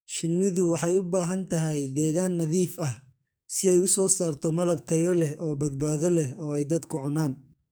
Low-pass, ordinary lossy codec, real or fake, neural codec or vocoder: none; none; fake; codec, 44.1 kHz, 2.6 kbps, SNAC